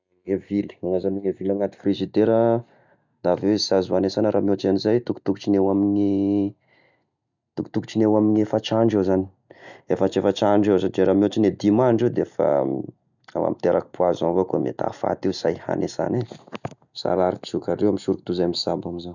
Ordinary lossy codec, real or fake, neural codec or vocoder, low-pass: none; real; none; 7.2 kHz